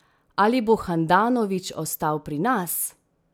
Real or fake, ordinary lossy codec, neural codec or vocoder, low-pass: real; none; none; none